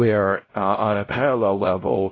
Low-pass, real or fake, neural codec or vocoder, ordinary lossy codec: 7.2 kHz; fake; codec, 16 kHz, 0.5 kbps, X-Codec, WavLM features, trained on Multilingual LibriSpeech; AAC, 32 kbps